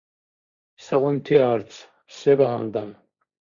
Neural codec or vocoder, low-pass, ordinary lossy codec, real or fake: codec, 16 kHz, 1.1 kbps, Voila-Tokenizer; 7.2 kHz; Opus, 64 kbps; fake